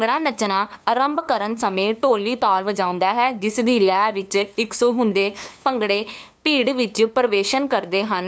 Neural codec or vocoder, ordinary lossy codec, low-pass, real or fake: codec, 16 kHz, 2 kbps, FunCodec, trained on LibriTTS, 25 frames a second; none; none; fake